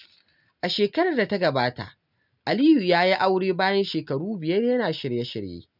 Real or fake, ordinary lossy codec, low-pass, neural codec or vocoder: real; none; 5.4 kHz; none